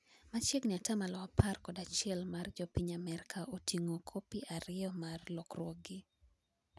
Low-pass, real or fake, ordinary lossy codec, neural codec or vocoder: none; real; none; none